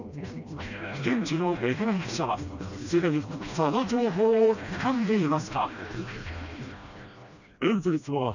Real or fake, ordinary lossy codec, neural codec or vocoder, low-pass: fake; none; codec, 16 kHz, 1 kbps, FreqCodec, smaller model; 7.2 kHz